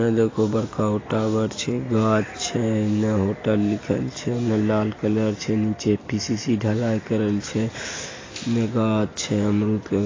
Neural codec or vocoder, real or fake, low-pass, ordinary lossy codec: none; real; 7.2 kHz; AAC, 32 kbps